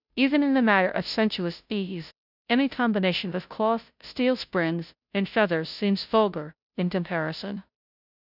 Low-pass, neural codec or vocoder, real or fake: 5.4 kHz; codec, 16 kHz, 0.5 kbps, FunCodec, trained on Chinese and English, 25 frames a second; fake